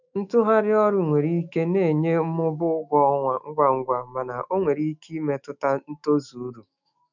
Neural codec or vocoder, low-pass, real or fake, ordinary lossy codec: autoencoder, 48 kHz, 128 numbers a frame, DAC-VAE, trained on Japanese speech; 7.2 kHz; fake; none